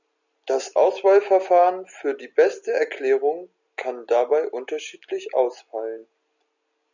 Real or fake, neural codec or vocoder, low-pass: real; none; 7.2 kHz